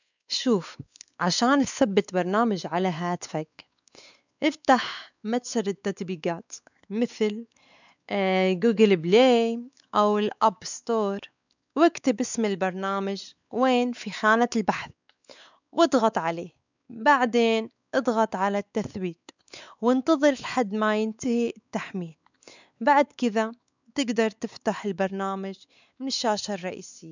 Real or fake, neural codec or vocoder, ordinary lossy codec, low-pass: fake; codec, 16 kHz, 4 kbps, X-Codec, WavLM features, trained on Multilingual LibriSpeech; none; 7.2 kHz